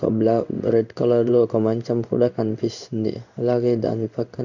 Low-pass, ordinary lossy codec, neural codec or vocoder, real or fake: 7.2 kHz; none; codec, 16 kHz in and 24 kHz out, 1 kbps, XY-Tokenizer; fake